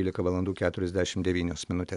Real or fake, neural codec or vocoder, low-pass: real; none; 10.8 kHz